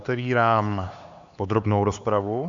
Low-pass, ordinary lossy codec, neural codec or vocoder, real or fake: 7.2 kHz; Opus, 64 kbps; codec, 16 kHz, 4 kbps, X-Codec, HuBERT features, trained on LibriSpeech; fake